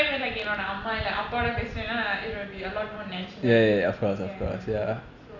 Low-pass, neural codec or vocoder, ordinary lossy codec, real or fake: 7.2 kHz; none; none; real